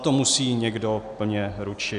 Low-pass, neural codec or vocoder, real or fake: 10.8 kHz; none; real